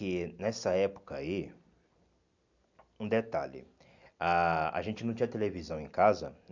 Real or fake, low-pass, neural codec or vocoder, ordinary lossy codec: real; 7.2 kHz; none; none